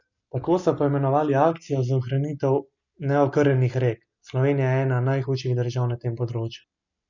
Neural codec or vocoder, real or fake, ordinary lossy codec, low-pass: none; real; none; 7.2 kHz